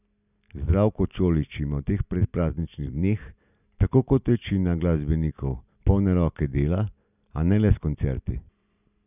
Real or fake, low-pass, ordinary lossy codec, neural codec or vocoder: real; 3.6 kHz; none; none